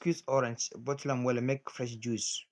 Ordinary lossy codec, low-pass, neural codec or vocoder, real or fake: none; none; none; real